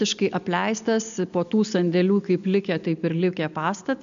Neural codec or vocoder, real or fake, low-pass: none; real; 7.2 kHz